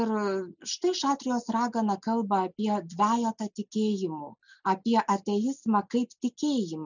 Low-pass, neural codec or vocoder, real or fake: 7.2 kHz; none; real